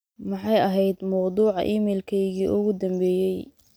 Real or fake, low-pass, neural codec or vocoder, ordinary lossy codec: real; none; none; none